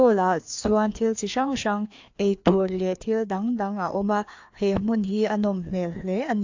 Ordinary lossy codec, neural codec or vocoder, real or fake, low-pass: AAC, 48 kbps; codec, 16 kHz, 2 kbps, FreqCodec, larger model; fake; 7.2 kHz